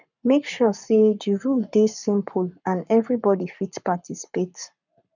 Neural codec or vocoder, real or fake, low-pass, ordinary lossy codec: codec, 44.1 kHz, 7.8 kbps, Pupu-Codec; fake; 7.2 kHz; none